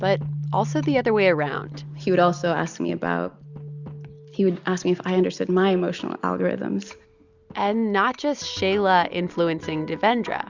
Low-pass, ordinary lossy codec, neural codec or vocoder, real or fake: 7.2 kHz; Opus, 64 kbps; none; real